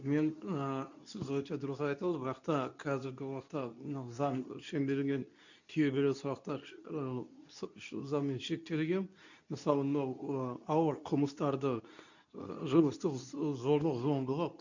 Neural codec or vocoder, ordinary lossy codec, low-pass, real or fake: codec, 24 kHz, 0.9 kbps, WavTokenizer, medium speech release version 2; none; 7.2 kHz; fake